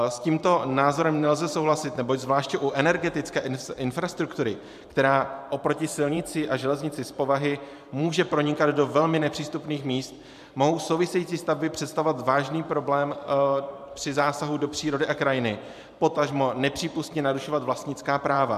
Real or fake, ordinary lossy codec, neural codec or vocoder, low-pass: fake; MP3, 96 kbps; vocoder, 44.1 kHz, 128 mel bands every 512 samples, BigVGAN v2; 14.4 kHz